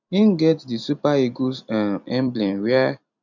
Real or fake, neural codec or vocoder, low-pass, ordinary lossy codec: fake; vocoder, 44.1 kHz, 128 mel bands every 256 samples, BigVGAN v2; 7.2 kHz; none